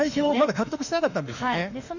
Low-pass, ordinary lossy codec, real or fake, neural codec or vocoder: 7.2 kHz; none; fake; autoencoder, 48 kHz, 32 numbers a frame, DAC-VAE, trained on Japanese speech